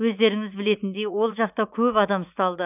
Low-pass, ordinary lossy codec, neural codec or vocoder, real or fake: 3.6 kHz; none; vocoder, 44.1 kHz, 80 mel bands, Vocos; fake